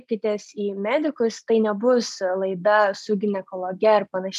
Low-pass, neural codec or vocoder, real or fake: 14.4 kHz; none; real